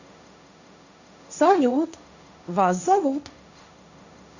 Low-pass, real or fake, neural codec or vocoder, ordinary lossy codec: 7.2 kHz; fake; codec, 16 kHz, 1.1 kbps, Voila-Tokenizer; none